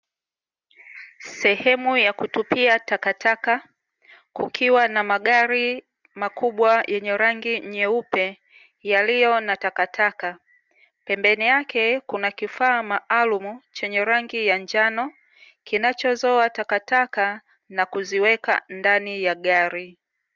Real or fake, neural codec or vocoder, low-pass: real; none; 7.2 kHz